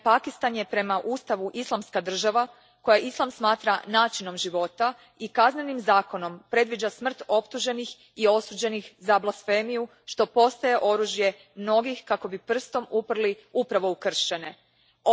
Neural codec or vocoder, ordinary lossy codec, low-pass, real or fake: none; none; none; real